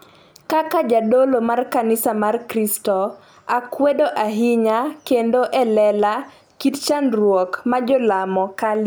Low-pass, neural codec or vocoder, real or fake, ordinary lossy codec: none; none; real; none